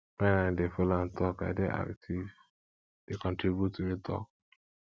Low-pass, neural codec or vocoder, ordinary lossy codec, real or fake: none; none; none; real